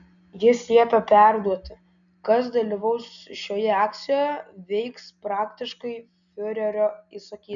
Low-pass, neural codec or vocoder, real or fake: 7.2 kHz; none; real